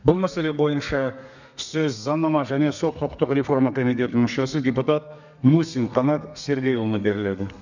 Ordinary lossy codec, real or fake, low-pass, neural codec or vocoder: none; fake; 7.2 kHz; codec, 32 kHz, 1.9 kbps, SNAC